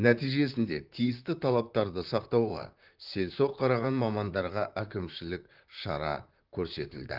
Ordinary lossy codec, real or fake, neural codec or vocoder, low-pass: Opus, 24 kbps; fake; vocoder, 44.1 kHz, 128 mel bands, Pupu-Vocoder; 5.4 kHz